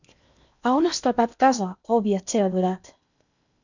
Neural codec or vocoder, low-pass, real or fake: codec, 16 kHz in and 24 kHz out, 0.8 kbps, FocalCodec, streaming, 65536 codes; 7.2 kHz; fake